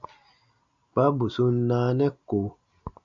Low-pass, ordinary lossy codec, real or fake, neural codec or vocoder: 7.2 kHz; MP3, 96 kbps; real; none